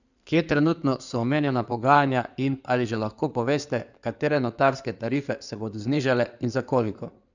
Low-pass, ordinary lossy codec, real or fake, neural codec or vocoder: 7.2 kHz; none; fake; codec, 16 kHz in and 24 kHz out, 2.2 kbps, FireRedTTS-2 codec